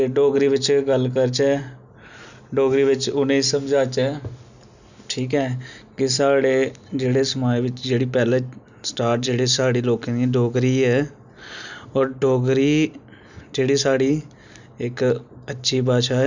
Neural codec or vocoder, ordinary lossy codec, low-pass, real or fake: none; none; 7.2 kHz; real